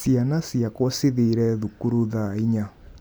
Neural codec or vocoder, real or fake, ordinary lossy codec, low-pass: none; real; none; none